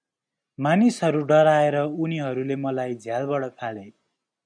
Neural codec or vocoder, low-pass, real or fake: none; 9.9 kHz; real